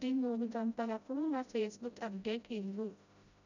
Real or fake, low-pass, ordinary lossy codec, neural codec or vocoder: fake; 7.2 kHz; none; codec, 16 kHz, 0.5 kbps, FreqCodec, smaller model